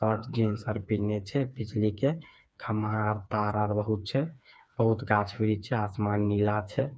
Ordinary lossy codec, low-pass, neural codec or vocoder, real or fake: none; none; codec, 16 kHz, 4 kbps, FreqCodec, smaller model; fake